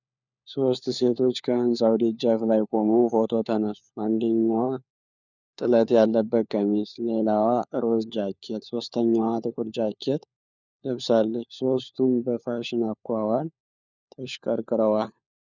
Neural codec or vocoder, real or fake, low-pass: codec, 16 kHz, 4 kbps, FunCodec, trained on LibriTTS, 50 frames a second; fake; 7.2 kHz